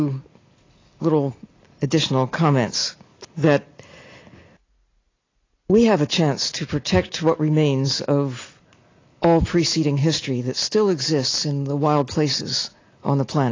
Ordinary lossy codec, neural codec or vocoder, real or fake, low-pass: AAC, 32 kbps; none; real; 7.2 kHz